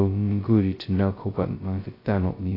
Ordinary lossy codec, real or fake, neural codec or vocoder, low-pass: AAC, 24 kbps; fake; codec, 16 kHz, 0.2 kbps, FocalCodec; 5.4 kHz